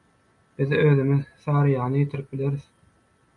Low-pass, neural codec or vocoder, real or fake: 10.8 kHz; none; real